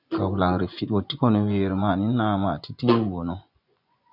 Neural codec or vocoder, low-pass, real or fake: vocoder, 24 kHz, 100 mel bands, Vocos; 5.4 kHz; fake